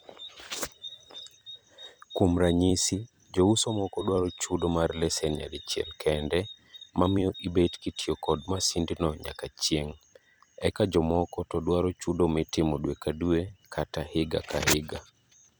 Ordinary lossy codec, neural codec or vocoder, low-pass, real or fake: none; vocoder, 44.1 kHz, 128 mel bands every 256 samples, BigVGAN v2; none; fake